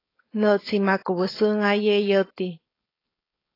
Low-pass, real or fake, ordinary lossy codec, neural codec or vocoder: 5.4 kHz; fake; AAC, 24 kbps; codec, 16 kHz, 4.8 kbps, FACodec